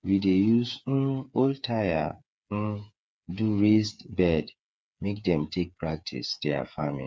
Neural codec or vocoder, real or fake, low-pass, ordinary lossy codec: codec, 16 kHz, 8 kbps, FreqCodec, smaller model; fake; none; none